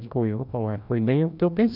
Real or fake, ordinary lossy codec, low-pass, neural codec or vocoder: fake; none; 5.4 kHz; codec, 16 kHz, 1 kbps, FreqCodec, larger model